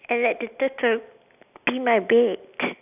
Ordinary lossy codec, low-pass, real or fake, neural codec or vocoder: none; 3.6 kHz; real; none